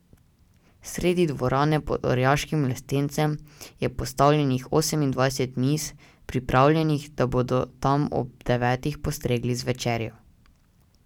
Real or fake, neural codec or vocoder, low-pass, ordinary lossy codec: real; none; 19.8 kHz; none